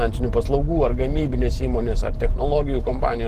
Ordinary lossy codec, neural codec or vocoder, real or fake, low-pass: Opus, 16 kbps; none; real; 14.4 kHz